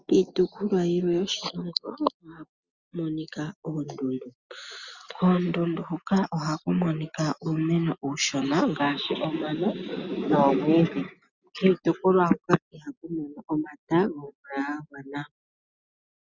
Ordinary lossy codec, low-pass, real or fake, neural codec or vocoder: Opus, 64 kbps; 7.2 kHz; real; none